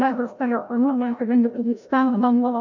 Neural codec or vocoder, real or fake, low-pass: codec, 16 kHz, 0.5 kbps, FreqCodec, larger model; fake; 7.2 kHz